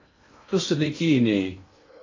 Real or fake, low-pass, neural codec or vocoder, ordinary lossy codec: fake; 7.2 kHz; codec, 16 kHz in and 24 kHz out, 0.6 kbps, FocalCodec, streaming, 2048 codes; AAC, 32 kbps